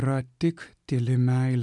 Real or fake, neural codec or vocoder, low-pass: real; none; 10.8 kHz